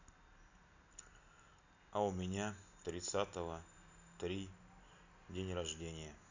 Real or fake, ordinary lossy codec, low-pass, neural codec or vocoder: real; none; 7.2 kHz; none